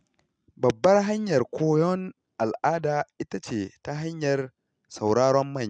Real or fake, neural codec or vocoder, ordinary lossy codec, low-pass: real; none; none; 9.9 kHz